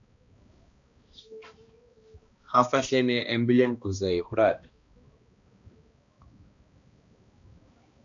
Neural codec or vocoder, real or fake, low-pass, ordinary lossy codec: codec, 16 kHz, 1 kbps, X-Codec, HuBERT features, trained on balanced general audio; fake; 7.2 kHz; AAC, 64 kbps